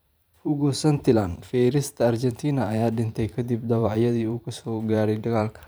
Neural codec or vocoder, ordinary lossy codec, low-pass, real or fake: vocoder, 44.1 kHz, 128 mel bands every 512 samples, BigVGAN v2; none; none; fake